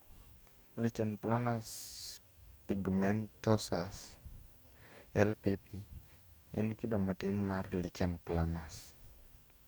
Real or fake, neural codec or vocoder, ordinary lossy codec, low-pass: fake; codec, 44.1 kHz, 2.6 kbps, DAC; none; none